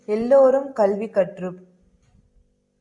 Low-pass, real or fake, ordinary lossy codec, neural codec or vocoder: 10.8 kHz; real; MP3, 64 kbps; none